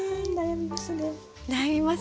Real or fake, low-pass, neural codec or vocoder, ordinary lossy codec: real; none; none; none